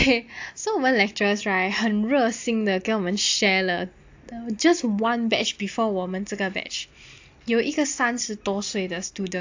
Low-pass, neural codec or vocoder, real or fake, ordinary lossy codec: 7.2 kHz; none; real; AAC, 48 kbps